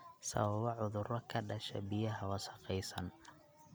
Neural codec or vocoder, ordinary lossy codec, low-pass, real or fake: none; none; none; real